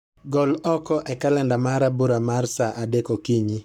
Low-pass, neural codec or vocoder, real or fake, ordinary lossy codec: 19.8 kHz; codec, 44.1 kHz, 7.8 kbps, Pupu-Codec; fake; none